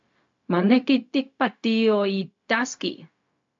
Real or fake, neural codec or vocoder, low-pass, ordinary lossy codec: fake; codec, 16 kHz, 0.4 kbps, LongCat-Audio-Codec; 7.2 kHz; MP3, 48 kbps